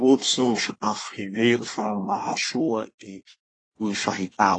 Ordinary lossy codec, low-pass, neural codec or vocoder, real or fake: AAC, 32 kbps; 9.9 kHz; codec, 24 kHz, 1 kbps, SNAC; fake